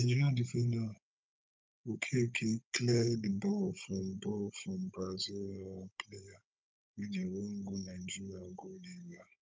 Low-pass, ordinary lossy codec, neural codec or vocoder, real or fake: none; none; codec, 16 kHz, 16 kbps, FunCodec, trained on Chinese and English, 50 frames a second; fake